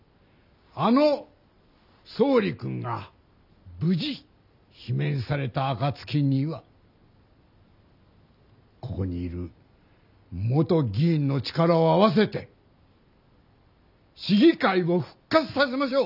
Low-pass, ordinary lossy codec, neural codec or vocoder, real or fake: 5.4 kHz; none; none; real